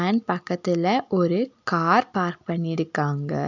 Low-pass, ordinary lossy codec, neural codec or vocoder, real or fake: 7.2 kHz; none; none; real